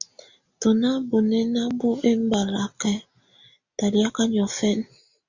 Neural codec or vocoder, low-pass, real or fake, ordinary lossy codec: none; 7.2 kHz; real; Opus, 64 kbps